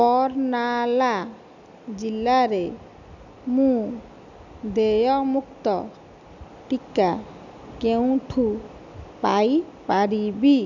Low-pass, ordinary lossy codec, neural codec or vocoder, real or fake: 7.2 kHz; none; none; real